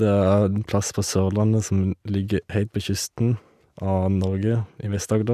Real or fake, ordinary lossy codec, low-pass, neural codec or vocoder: real; none; 14.4 kHz; none